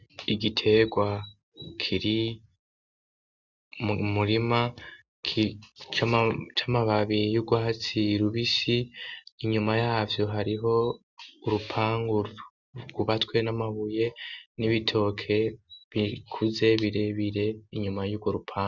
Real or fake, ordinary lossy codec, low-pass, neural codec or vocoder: real; Opus, 64 kbps; 7.2 kHz; none